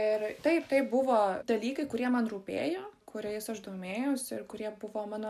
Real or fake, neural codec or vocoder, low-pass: real; none; 14.4 kHz